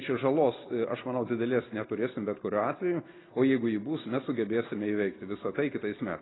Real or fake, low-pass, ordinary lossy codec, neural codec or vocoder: real; 7.2 kHz; AAC, 16 kbps; none